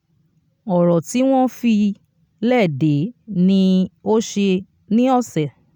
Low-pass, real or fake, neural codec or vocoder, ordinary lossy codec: 19.8 kHz; real; none; none